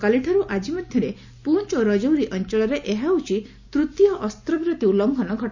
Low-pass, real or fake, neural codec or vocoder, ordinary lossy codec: 7.2 kHz; real; none; none